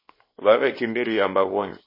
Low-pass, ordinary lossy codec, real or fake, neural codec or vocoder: 5.4 kHz; MP3, 24 kbps; fake; codec, 24 kHz, 0.9 kbps, WavTokenizer, small release